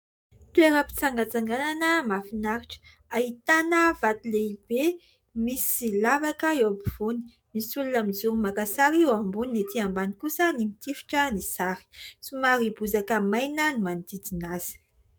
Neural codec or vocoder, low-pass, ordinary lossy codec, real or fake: codec, 44.1 kHz, 7.8 kbps, DAC; 19.8 kHz; MP3, 96 kbps; fake